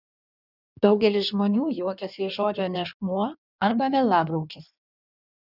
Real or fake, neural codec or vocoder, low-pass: fake; codec, 16 kHz in and 24 kHz out, 1.1 kbps, FireRedTTS-2 codec; 5.4 kHz